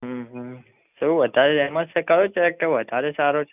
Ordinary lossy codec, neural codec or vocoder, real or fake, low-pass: none; none; real; 3.6 kHz